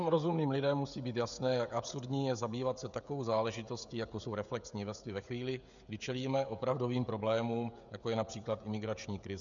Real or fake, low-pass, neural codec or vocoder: fake; 7.2 kHz; codec, 16 kHz, 16 kbps, FreqCodec, smaller model